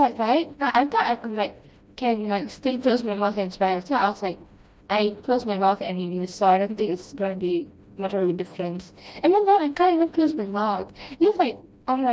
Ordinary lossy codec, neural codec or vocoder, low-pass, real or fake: none; codec, 16 kHz, 1 kbps, FreqCodec, smaller model; none; fake